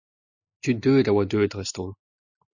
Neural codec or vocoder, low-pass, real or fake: none; 7.2 kHz; real